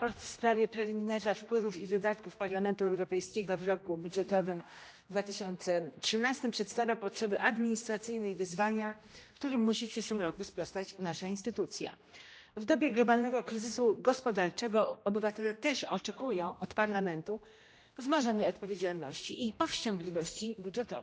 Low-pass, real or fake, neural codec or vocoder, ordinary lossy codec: none; fake; codec, 16 kHz, 1 kbps, X-Codec, HuBERT features, trained on general audio; none